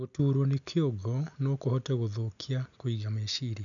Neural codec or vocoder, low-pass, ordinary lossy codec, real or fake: none; 7.2 kHz; none; real